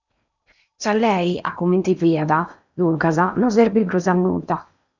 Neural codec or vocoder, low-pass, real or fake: codec, 16 kHz in and 24 kHz out, 0.8 kbps, FocalCodec, streaming, 65536 codes; 7.2 kHz; fake